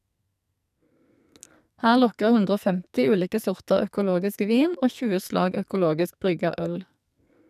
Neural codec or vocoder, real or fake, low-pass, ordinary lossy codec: codec, 44.1 kHz, 2.6 kbps, SNAC; fake; 14.4 kHz; none